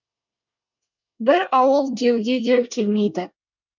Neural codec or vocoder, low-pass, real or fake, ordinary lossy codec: codec, 24 kHz, 1 kbps, SNAC; 7.2 kHz; fake; none